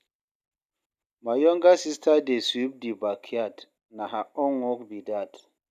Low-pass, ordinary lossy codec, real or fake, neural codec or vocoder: 14.4 kHz; none; real; none